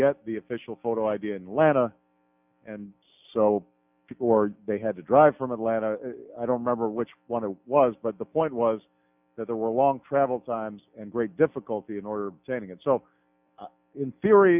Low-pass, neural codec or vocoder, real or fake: 3.6 kHz; none; real